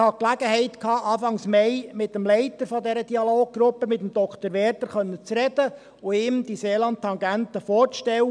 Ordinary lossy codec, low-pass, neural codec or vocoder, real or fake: none; 9.9 kHz; none; real